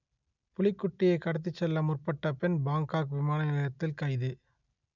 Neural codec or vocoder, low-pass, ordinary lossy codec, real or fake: none; 7.2 kHz; none; real